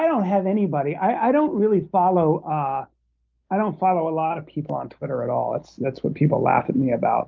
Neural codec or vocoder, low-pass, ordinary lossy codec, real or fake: none; 7.2 kHz; Opus, 32 kbps; real